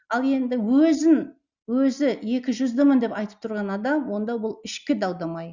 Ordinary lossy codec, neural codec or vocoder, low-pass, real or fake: Opus, 64 kbps; none; 7.2 kHz; real